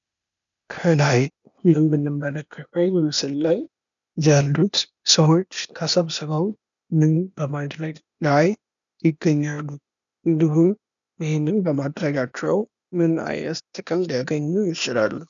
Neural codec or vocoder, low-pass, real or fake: codec, 16 kHz, 0.8 kbps, ZipCodec; 7.2 kHz; fake